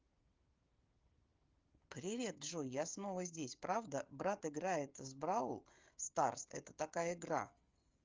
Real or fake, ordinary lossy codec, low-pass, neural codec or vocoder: fake; Opus, 32 kbps; 7.2 kHz; codec, 16 kHz, 16 kbps, FreqCodec, smaller model